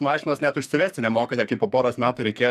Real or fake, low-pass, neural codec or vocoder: fake; 14.4 kHz; codec, 44.1 kHz, 2.6 kbps, SNAC